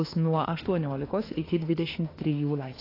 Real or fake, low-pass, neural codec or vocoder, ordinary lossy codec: fake; 5.4 kHz; autoencoder, 48 kHz, 32 numbers a frame, DAC-VAE, trained on Japanese speech; AAC, 24 kbps